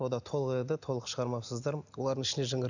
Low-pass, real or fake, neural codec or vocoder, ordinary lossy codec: 7.2 kHz; real; none; none